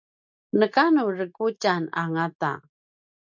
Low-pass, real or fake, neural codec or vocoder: 7.2 kHz; real; none